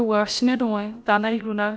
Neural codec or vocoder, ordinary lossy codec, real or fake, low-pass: codec, 16 kHz, about 1 kbps, DyCAST, with the encoder's durations; none; fake; none